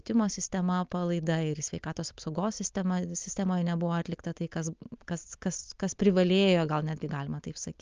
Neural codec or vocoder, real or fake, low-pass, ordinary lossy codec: none; real; 7.2 kHz; Opus, 24 kbps